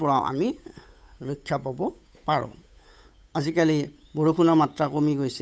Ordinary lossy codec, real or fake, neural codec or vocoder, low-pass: none; fake; codec, 16 kHz, 8 kbps, FunCodec, trained on Chinese and English, 25 frames a second; none